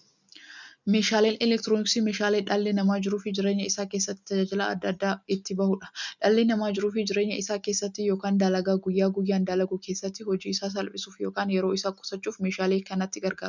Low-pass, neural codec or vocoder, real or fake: 7.2 kHz; none; real